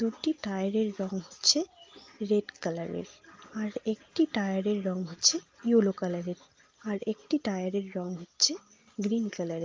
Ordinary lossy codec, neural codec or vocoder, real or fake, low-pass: Opus, 32 kbps; none; real; 7.2 kHz